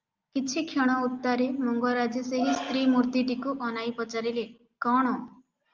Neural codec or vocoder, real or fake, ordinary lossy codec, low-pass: none; real; Opus, 24 kbps; 7.2 kHz